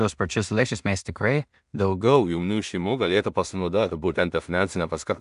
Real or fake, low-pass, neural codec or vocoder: fake; 10.8 kHz; codec, 16 kHz in and 24 kHz out, 0.4 kbps, LongCat-Audio-Codec, two codebook decoder